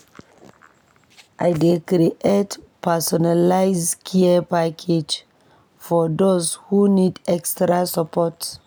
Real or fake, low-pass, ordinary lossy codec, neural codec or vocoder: real; none; none; none